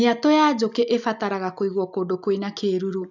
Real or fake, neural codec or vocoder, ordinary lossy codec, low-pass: real; none; none; 7.2 kHz